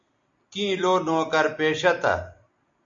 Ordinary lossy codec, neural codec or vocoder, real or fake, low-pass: MP3, 48 kbps; none; real; 7.2 kHz